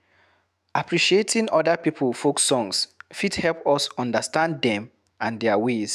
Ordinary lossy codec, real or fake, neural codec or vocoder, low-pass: none; fake; autoencoder, 48 kHz, 128 numbers a frame, DAC-VAE, trained on Japanese speech; 14.4 kHz